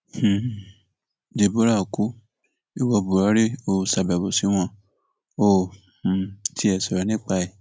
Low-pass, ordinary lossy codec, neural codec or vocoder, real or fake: none; none; none; real